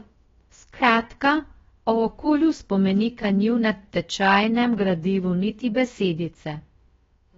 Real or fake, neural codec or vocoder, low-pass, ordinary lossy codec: fake; codec, 16 kHz, about 1 kbps, DyCAST, with the encoder's durations; 7.2 kHz; AAC, 24 kbps